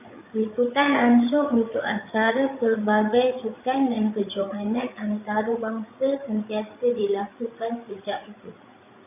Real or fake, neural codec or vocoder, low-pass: fake; codec, 16 kHz, 8 kbps, FreqCodec, larger model; 3.6 kHz